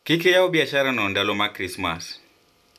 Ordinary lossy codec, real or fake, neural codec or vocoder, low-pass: none; real; none; 14.4 kHz